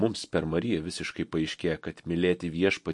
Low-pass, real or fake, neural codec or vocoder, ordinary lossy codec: 10.8 kHz; real; none; MP3, 48 kbps